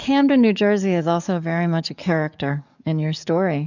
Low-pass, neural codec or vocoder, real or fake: 7.2 kHz; codec, 44.1 kHz, 7.8 kbps, DAC; fake